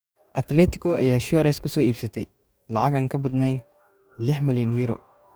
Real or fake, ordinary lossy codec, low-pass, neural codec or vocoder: fake; none; none; codec, 44.1 kHz, 2.6 kbps, DAC